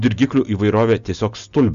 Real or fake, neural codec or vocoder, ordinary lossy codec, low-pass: real; none; Opus, 64 kbps; 7.2 kHz